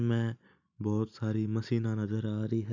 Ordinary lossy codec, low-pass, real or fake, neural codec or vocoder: none; 7.2 kHz; real; none